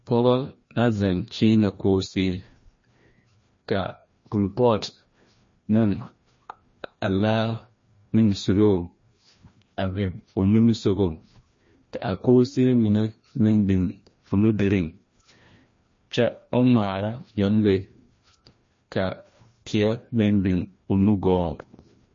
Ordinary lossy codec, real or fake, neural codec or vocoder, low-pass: MP3, 32 kbps; fake; codec, 16 kHz, 1 kbps, FreqCodec, larger model; 7.2 kHz